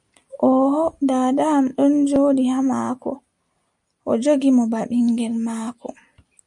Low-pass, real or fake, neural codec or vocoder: 10.8 kHz; real; none